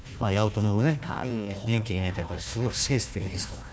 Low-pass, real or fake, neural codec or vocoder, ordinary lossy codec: none; fake; codec, 16 kHz, 1 kbps, FunCodec, trained on Chinese and English, 50 frames a second; none